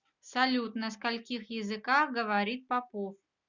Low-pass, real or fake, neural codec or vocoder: 7.2 kHz; real; none